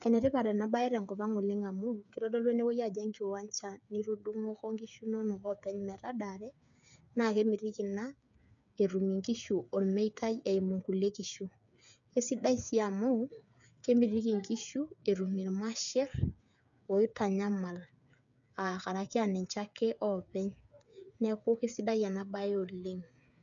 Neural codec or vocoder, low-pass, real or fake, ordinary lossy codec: codec, 16 kHz, 8 kbps, FreqCodec, smaller model; 7.2 kHz; fake; none